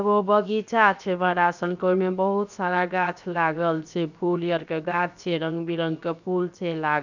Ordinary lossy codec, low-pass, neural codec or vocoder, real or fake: none; 7.2 kHz; codec, 16 kHz, 0.7 kbps, FocalCodec; fake